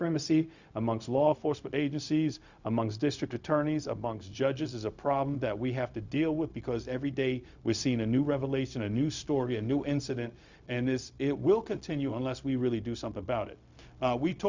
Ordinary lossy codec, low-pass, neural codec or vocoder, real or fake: Opus, 64 kbps; 7.2 kHz; codec, 16 kHz, 0.4 kbps, LongCat-Audio-Codec; fake